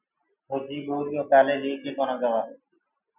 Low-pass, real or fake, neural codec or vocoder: 3.6 kHz; real; none